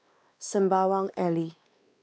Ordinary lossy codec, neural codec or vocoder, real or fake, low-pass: none; codec, 16 kHz, 2 kbps, X-Codec, WavLM features, trained on Multilingual LibriSpeech; fake; none